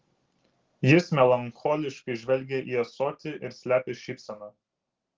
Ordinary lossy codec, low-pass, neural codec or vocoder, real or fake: Opus, 16 kbps; 7.2 kHz; none; real